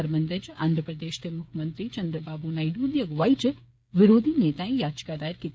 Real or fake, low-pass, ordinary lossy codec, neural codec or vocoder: fake; none; none; codec, 16 kHz, 8 kbps, FreqCodec, smaller model